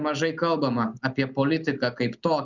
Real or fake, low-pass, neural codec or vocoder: real; 7.2 kHz; none